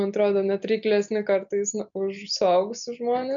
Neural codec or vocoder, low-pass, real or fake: none; 7.2 kHz; real